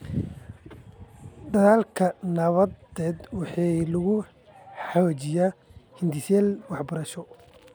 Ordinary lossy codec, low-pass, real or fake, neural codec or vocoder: none; none; real; none